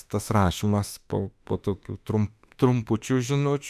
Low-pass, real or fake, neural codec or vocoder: 14.4 kHz; fake; autoencoder, 48 kHz, 32 numbers a frame, DAC-VAE, trained on Japanese speech